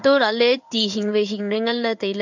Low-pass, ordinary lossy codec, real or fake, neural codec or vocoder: 7.2 kHz; none; fake; codec, 16 kHz in and 24 kHz out, 1 kbps, XY-Tokenizer